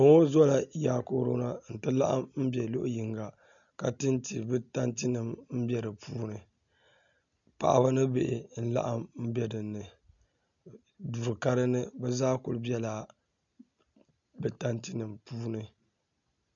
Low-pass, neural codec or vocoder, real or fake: 7.2 kHz; none; real